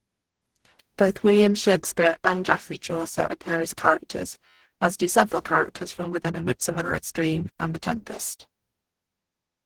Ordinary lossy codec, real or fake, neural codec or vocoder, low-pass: Opus, 16 kbps; fake; codec, 44.1 kHz, 0.9 kbps, DAC; 19.8 kHz